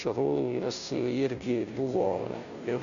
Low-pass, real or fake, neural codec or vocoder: 7.2 kHz; fake; codec, 16 kHz, 0.5 kbps, FunCodec, trained on Chinese and English, 25 frames a second